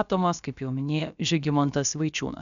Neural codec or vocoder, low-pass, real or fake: codec, 16 kHz, about 1 kbps, DyCAST, with the encoder's durations; 7.2 kHz; fake